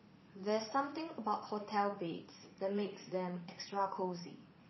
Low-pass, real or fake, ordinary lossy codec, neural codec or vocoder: 7.2 kHz; fake; MP3, 24 kbps; vocoder, 44.1 kHz, 80 mel bands, Vocos